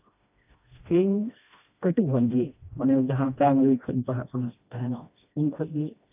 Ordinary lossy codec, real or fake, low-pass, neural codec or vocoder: AAC, 32 kbps; fake; 3.6 kHz; codec, 16 kHz, 1 kbps, FreqCodec, smaller model